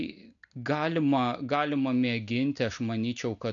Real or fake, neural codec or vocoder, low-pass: real; none; 7.2 kHz